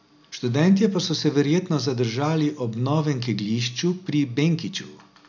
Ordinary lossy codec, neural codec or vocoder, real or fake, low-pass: none; none; real; 7.2 kHz